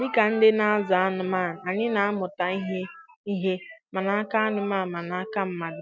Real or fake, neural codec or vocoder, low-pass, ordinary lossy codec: real; none; none; none